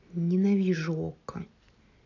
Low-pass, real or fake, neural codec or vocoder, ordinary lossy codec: 7.2 kHz; real; none; none